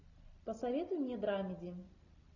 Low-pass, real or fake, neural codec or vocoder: 7.2 kHz; real; none